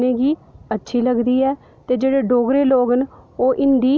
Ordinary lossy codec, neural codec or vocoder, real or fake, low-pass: none; none; real; none